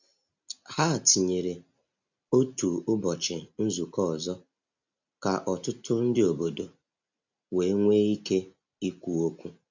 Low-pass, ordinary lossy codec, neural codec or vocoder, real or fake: 7.2 kHz; none; none; real